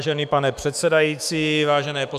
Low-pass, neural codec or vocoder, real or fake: 14.4 kHz; autoencoder, 48 kHz, 128 numbers a frame, DAC-VAE, trained on Japanese speech; fake